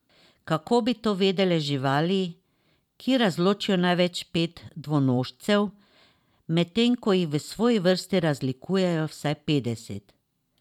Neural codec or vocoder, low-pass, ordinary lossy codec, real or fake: vocoder, 44.1 kHz, 128 mel bands every 512 samples, BigVGAN v2; 19.8 kHz; none; fake